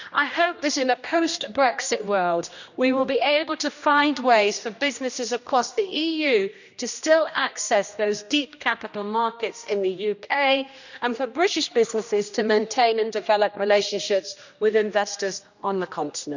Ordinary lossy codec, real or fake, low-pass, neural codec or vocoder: none; fake; 7.2 kHz; codec, 16 kHz, 1 kbps, X-Codec, HuBERT features, trained on general audio